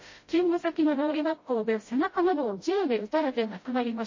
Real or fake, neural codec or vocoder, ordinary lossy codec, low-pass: fake; codec, 16 kHz, 0.5 kbps, FreqCodec, smaller model; MP3, 32 kbps; 7.2 kHz